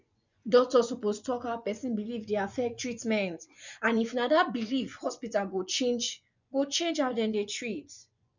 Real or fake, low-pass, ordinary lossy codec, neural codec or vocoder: fake; 7.2 kHz; none; vocoder, 24 kHz, 100 mel bands, Vocos